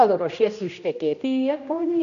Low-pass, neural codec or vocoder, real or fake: 7.2 kHz; codec, 16 kHz, 1 kbps, X-Codec, HuBERT features, trained on general audio; fake